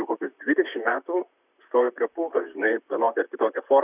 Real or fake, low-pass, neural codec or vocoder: fake; 3.6 kHz; vocoder, 44.1 kHz, 128 mel bands, Pupu-Vocoder